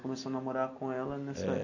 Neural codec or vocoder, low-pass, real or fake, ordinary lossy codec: none; 7.2 kHz; real; none